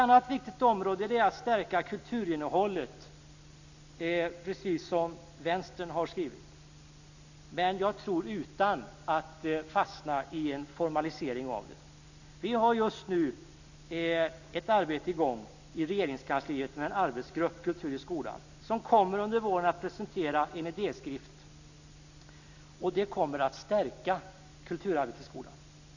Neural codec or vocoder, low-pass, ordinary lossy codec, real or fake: none; 7.2 kHz; none; real